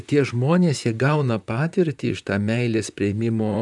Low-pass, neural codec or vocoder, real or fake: 10.8 kHz; vocoder, 44.1 kHz, 128 mel bands, Pupu-Vocoder; fake